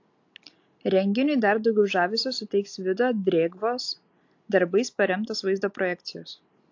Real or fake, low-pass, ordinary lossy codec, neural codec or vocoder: real; 7.2 kHz; AAC, 48 kbps; none